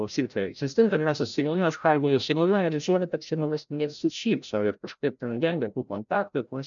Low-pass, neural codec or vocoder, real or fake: 7.2 kHz; codec, 16 kHz, 0.5 kbps, FreqCodec, larger model; fake